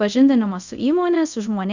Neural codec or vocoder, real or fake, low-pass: codec, 24 kHz, 0.5 kbps, DualCodec; fake; 7.2 kHz